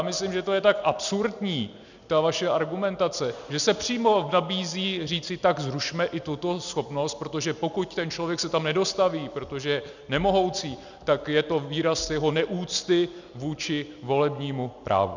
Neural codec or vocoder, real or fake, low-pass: none; real; 7.2 kHz